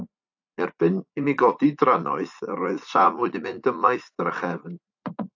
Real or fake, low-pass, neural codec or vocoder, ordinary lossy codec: fake; 7.2 kHz; vocoder, 44.1 kHz, 80 mel bands, Vocos; AAC, 48 kbps